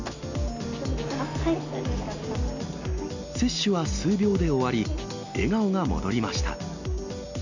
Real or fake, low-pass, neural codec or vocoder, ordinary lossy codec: real; 7.2 kHz; none; none